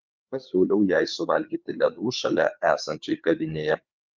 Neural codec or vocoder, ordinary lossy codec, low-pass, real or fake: codec, 16 kHz, 4 kbps, FreqCodec, larger model; Opus, 24 kbps; 7.2 kHz; fake